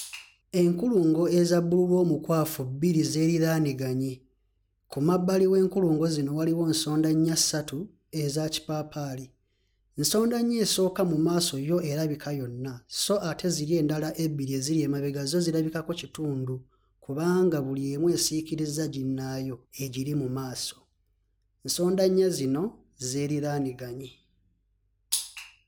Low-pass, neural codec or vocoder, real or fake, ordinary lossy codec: none; none; real; none